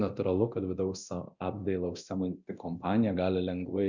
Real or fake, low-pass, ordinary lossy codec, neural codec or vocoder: fake; 7.2 kHz; Opus, 64 kbps; codec, 24 kHz, 0.9 kbps, DualCodec